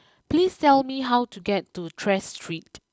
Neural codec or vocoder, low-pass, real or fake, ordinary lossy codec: none; none; real; none